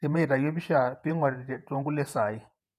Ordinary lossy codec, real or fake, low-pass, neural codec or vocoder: none; fake; 14.4 kHz; vocoder, 48 kHz, 128 mel bands, Vocos